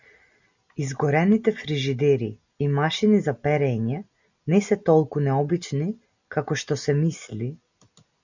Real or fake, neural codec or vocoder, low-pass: real; none; 7.2 kHz